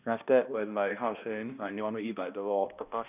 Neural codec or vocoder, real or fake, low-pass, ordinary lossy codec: codec, 16 kHz, 1 kbps, X-Codec, HuBERT features, trained on balanced general audio; fake; 3.6 kHz; none